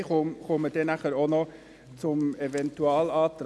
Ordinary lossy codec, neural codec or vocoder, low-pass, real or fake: none; none; none; real